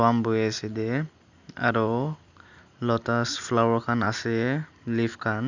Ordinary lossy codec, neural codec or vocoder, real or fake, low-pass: none; none; real; 7.2 kHz